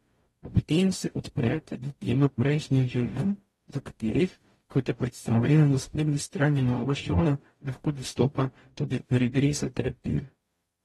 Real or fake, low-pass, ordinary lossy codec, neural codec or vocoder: fake; 19.8 kHz; AAC, 32 kbps; codec, 44.1 kHz, 0.9 kbps, DAC